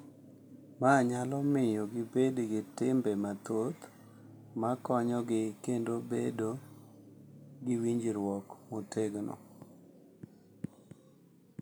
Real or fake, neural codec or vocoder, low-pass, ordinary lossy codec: real; none; none; none